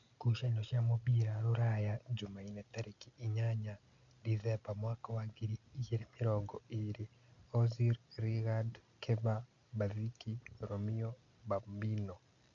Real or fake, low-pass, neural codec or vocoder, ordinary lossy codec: real; 7.2 kHz; none; MP3, 96 kbps